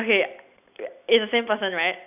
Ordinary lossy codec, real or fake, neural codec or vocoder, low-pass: none; real; none; 3.6 kHz